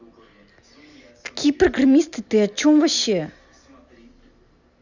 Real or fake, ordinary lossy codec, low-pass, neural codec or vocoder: real; Opus, 64 kbps; 7.2 kHz; none